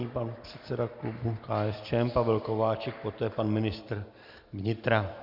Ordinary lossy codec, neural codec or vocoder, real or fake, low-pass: Opus, 64 kbps; none; real; 5.4 kHz